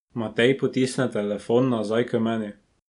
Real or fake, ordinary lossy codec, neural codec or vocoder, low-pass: real; none; none; 10.8 kHz